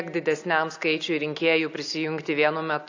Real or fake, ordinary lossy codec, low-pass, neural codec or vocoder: real; AAC, 48 kbps; 7.2 kHz; none